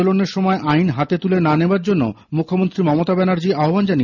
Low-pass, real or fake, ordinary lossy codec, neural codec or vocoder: 7.2 kHz; real; none; none